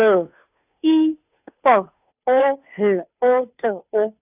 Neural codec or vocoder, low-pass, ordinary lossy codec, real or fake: codec, 16 kHz, 2 kbps, FunCodec, trained on Chinese and English, 25 frames a second; 3.6 kHz; none; fake